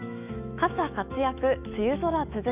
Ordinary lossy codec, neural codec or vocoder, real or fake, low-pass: none; autoencoder, 48 kHz, 128 numbers a frame, DAC-VAE, trained on Japanese speech; fake; 3.6 kHz